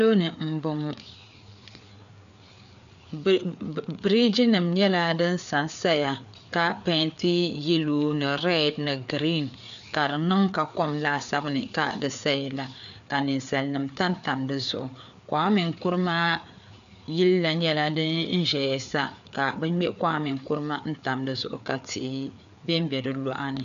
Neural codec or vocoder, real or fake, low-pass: codec, 16 kHz, 4 kbps, FreqCodec, larger model; fake; 7.2 kHz